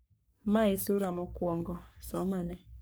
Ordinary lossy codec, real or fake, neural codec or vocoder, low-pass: none; fake; codec, 44.1 kHz, 3.4 kbps, Pupu-Codec; none